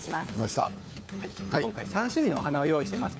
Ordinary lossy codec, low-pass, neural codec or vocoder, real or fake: none; none; codec, 16 kHz, 4 kbps, FunCodec, trained on LibriTTS, 50 frames a second; fake